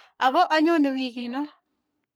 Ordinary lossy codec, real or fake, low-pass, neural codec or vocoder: none; fake; none; codec, 44.1 kHz, 3.4 kbps, Pupu-Codec